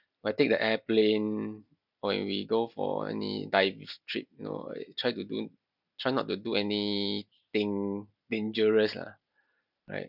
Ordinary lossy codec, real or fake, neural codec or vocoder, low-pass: none; real; none; 5.4 kHz